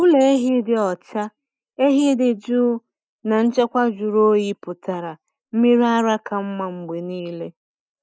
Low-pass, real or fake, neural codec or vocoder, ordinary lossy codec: none; real; none; none